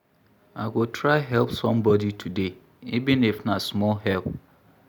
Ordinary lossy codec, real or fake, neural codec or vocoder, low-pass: none; real; none; none